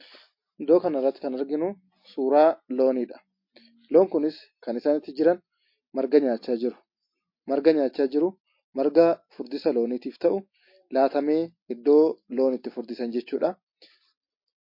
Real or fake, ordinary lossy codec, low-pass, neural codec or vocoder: real; MP3, 32 kbps; 5.4 kHz; none